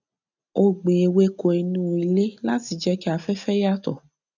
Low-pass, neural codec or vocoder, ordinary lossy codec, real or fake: 7.2 kHz; none; none; real